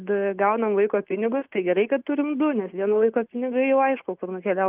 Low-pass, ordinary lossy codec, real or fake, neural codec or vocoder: 3.6 kHz; Opus, 32 kbps; real; none